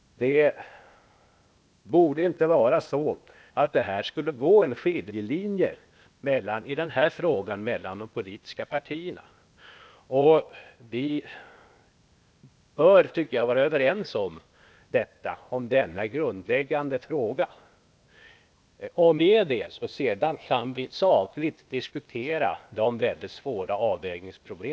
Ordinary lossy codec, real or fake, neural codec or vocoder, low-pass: none; fake; codec, 16 kHz, 0.8 kbps, ZipCodec; none